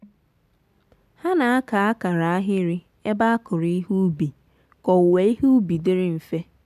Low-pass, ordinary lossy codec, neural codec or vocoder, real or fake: 14.4 kHz; none; none; real